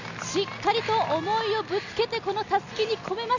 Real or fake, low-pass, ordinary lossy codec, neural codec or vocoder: real; 7.2 kHz; none; none